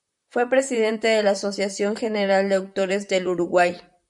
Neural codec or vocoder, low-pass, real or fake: vocoder, 44.1 kHz, 128 mel bands, Pupu-Vocoder; 10.8 kHz; fake